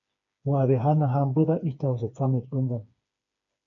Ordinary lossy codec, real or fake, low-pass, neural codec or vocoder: AAC, 48 kbps; fake; 7.2 kHz; codec, 16 kHz, 4 kbps, FreqCodec, smaller model